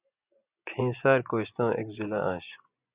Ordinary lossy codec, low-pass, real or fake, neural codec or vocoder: Opus, 64 kbps; 3.6 kHz; fake; vocoder, 24 kHz, 100 mel bands, Vocos